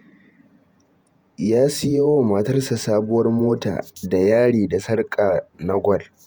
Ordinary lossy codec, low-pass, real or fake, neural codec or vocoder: none; none; fake; vocoder, 48 kHz, 128 mel bands, Vocos